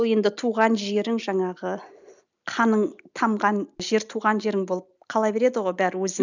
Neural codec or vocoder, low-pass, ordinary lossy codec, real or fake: none; 7.2 kHz; none; real